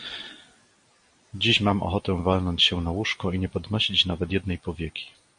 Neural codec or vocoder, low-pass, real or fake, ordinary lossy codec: none; 9.9 kHz; real; MP3, 48 kbps